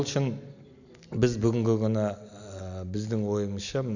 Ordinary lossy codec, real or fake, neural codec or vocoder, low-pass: none; real; none; 7.2 kHz